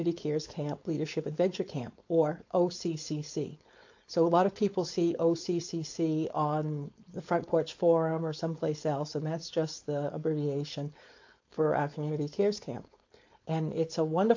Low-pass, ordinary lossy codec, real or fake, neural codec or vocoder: 7.2 kHz; AAC, 48 kbps; fake; codec, 16 kHz, 4.8 kbps, FACodec